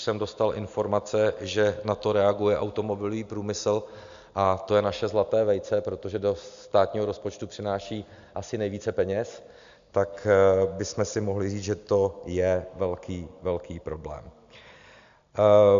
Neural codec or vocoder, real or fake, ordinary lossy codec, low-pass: none; real; MP3, 64 kbps; 7.2 kHz